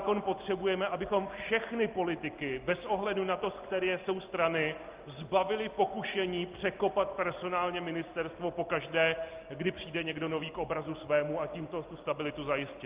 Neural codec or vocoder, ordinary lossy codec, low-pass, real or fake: none; Opus, 24 kbps; 3.6 kHz; real